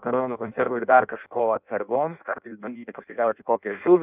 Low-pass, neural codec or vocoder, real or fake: 3.6 kHz; codec, 16 kHz in and 24 kHz out, 0.6 kbps, FireRedTTS-2 codec; fake